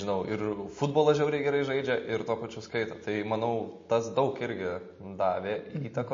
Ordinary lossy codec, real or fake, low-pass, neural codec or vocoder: MP3, 32 kbps; real; 7.2 kHz; none